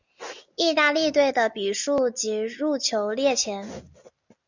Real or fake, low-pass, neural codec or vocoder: real; 7.2 kHz; none